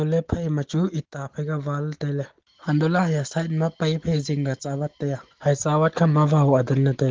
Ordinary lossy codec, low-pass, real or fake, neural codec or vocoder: Opus, 16 kbps; 7.2 kHz; real; none